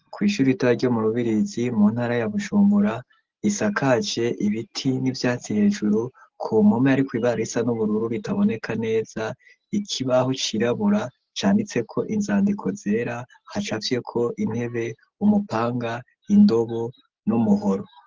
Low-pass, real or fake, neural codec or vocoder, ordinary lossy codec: 7.2 kHz; real; none; Opus, 16 kbps